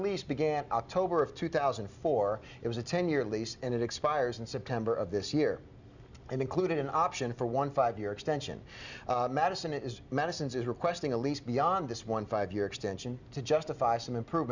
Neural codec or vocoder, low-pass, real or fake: none; 7.2 kHz; real